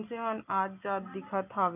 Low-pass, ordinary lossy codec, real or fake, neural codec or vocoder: 3.6 kHz; none; real; none